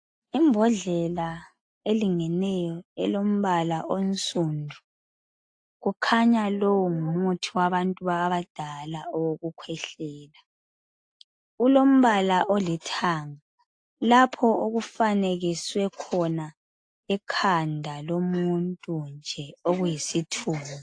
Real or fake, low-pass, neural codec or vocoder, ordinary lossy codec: real; 9.9 kHz; none; AAC, 48 kbps